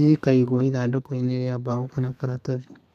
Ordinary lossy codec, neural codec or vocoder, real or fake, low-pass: none; codec, 32 kHz, 1.9 kbps, SNAC; fake; 14.4 kHz